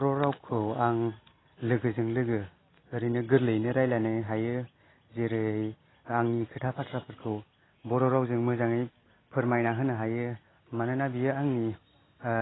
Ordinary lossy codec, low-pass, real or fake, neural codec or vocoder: AAC, 16 kbps; 7.2 kHz; real; none